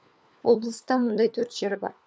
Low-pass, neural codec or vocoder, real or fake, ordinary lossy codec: none; codec, 16 kHz, 4 kbps, FunCodec, trained on LibriTTS, 50 frames a second; fake; none